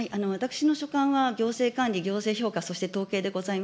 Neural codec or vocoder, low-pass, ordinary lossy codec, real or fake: none; none; none; real